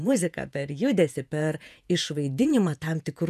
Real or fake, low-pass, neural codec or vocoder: fake; 14.4 kHz; vocoder, 48 kHz, 128 mel bands, Vocos